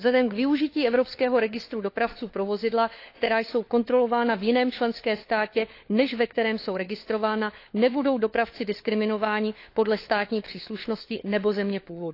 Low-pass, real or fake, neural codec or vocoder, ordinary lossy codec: 5.4 kHz; fake; codec, 16 kHz, 4 kbps, FunCodec, trained on LibriTTS, 50 frames a second; AAC, 32 kbps